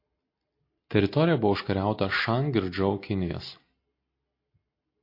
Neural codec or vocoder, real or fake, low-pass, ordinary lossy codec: none; real; 5.4 kHz; MP3, 32 kbps